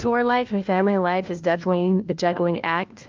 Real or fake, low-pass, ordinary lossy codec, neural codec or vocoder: fake; 7.2 kHz; Opus, 24 kbps; codec, 16 kHz, 1 kbps, FunCodec, trained on LibriTTS, 50 frames a second